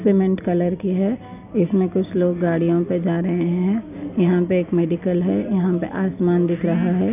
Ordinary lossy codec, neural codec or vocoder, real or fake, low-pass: none; none; real; 3.6 kHz